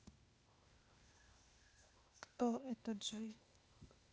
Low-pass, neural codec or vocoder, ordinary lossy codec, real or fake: none; codec, 16 kHz, 0.8 kbps, ZipCodec; none; fake